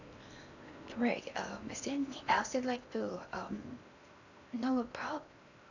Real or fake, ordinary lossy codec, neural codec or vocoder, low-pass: fake; none; codec, 16 kHz in and 24 kHz out, 0.8 kbps, FocalCodec, streaming, 65536 codes; 7.2 kHz